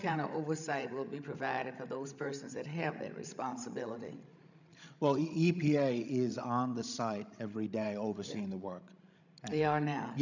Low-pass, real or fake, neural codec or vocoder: 7.2 kHz; fake; codec, 16 kHz, 16 kbps, FreqCodec, larger model